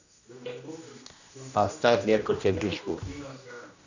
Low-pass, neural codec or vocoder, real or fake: 7.2 kHz; codec, 16 kHz, 1 kbps, X-Codec, HuBERT features, trained on general audio; fake